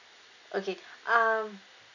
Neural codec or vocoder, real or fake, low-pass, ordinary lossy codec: none; real; 7.2 kHz; none